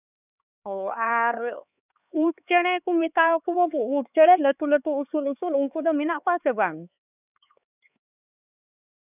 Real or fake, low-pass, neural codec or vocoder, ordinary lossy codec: fake; 3.6 kHz; codec, 16 kHz, 4 kbps, X-Codec, HuBERT features, trained on LibriSpeech; none